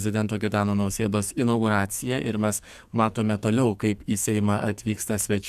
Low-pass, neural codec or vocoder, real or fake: 14.4 kHz; codec, 44.1 kHz, 2.6 kbps, SNAC; fake